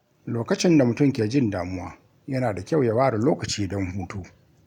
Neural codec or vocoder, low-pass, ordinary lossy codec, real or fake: none; 19.8 kHz; none; real